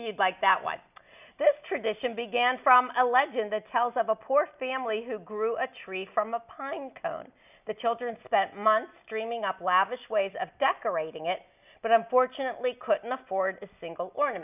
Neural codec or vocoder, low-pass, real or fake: none; 3.6 kHz; real